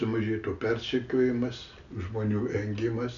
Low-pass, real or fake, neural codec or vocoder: 7.2 kHz; real; none